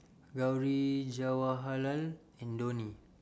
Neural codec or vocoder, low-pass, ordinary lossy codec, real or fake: none; none; none; real